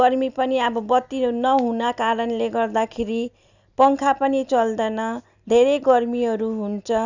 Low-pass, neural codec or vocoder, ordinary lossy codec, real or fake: 7.2 kHz; none; none; real